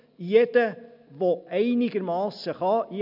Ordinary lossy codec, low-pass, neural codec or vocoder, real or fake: none; 5.4 kHz; none; real